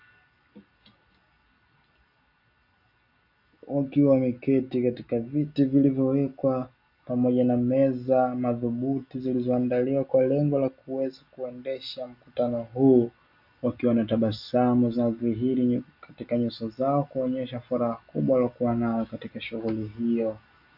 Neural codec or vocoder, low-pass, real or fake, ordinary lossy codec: none; 5.4 kHz; real; AAC, 48 kbps